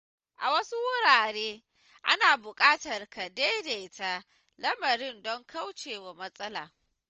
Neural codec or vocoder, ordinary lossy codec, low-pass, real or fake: none; Opus, 24 kbps; 7.2 kHz; real